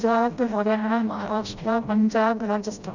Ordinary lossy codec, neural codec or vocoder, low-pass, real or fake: none; codec, 16 kHz, 0.5 kbps, FreqCodec, smaller model; 7.2 kHz; fake